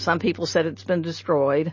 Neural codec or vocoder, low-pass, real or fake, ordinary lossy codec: none; 7.2 kHz; real; MP3, 32 kbps